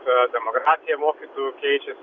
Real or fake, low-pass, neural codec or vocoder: real; 7.2 kHz; none